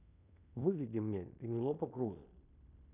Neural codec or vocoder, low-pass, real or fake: codec, 16 kHz in and 24 kHz out, 0.9 kbps, LongCat-Audio-Codec, fine tuned four codebook decoder; 3.6 kHz; fake